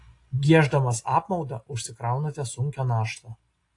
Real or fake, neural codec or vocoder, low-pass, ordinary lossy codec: real; none; 10.8 kHz; AAC, 48 kbps